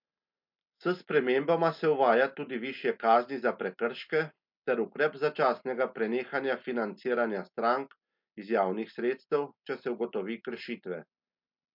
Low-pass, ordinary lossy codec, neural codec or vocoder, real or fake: 5.4 kHz; MP3, 48 kbps; none; real